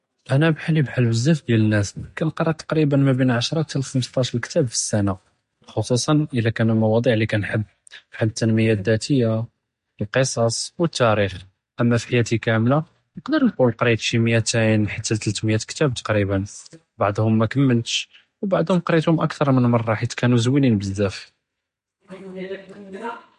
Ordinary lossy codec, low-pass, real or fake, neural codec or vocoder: MP3, 48 kbps; 14.4 kHz; real; none